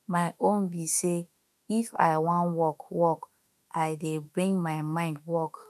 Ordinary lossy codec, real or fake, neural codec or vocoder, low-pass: MP3, 96 kbps; fake; autoencoder, 48 kHz, 32 numbers a frame, DAC-VAE, trained on Japanese speech; 14.4 kHz